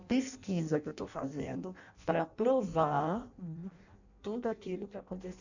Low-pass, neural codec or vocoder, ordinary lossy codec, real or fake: 7.2 kHz; codec, 16 kHz in and 24 kHz out, 0.6 kbps, FireRedTTS-2 codec; none; fake